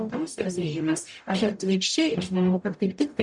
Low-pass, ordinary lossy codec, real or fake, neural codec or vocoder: 10.8 kHz; MP3, 96 kbps; fake; codec, 44.1 kHz, 0.9 kbps, DAC